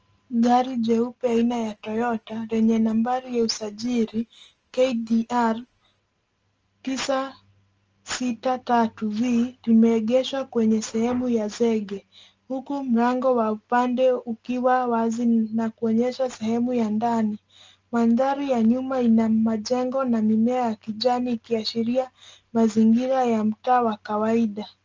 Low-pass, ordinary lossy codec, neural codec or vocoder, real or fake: 7.2 kHz; Opus, 24 kbps; none; real